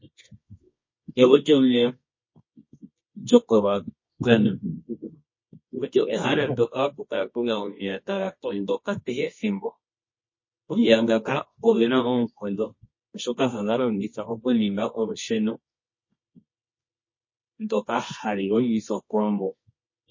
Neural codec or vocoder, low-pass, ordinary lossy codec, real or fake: codec, 24 kHz, 0.9 kbps, WavTokenizer, medium music audio release; 7.2 kHz; MP3, 32 kbps; fake